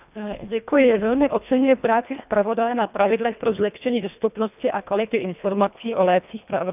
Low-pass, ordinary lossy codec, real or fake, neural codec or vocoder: 3.6 kHz; none; fake; codec, 24 kHz, 1.5 kbps, HILCodec